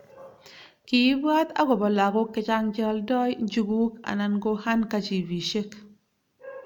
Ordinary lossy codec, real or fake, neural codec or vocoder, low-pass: none; real; none; 19.8 kHz